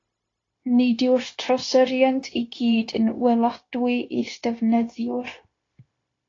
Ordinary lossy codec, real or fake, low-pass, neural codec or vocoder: AAC, 32 kbps; fake; 7.2 kHz; codec, 16 kHz, 0.9 kbps, LongCat-Audio-Codec